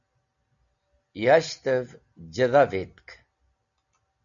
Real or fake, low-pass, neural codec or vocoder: real; 7.2 kHz; none